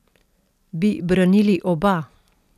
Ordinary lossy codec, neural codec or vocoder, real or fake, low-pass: none; none; real; 14.4 kHz